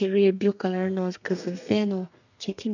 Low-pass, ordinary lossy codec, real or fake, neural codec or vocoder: 7.2 kHz; none; fake; codec, 32 kHz, 1.9 kbps, SNAC